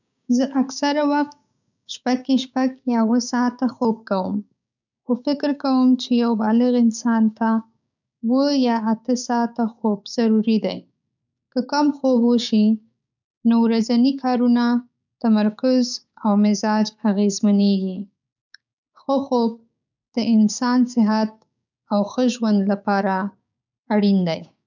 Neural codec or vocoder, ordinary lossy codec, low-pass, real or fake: codec, 16 kHz, 6 kbps, DAC; none; 7.2 kHz; fake